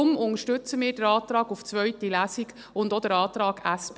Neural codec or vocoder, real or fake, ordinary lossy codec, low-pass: none; real; none; none